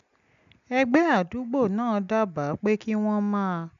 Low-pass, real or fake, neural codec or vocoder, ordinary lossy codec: 7.2 kHz; real; none; none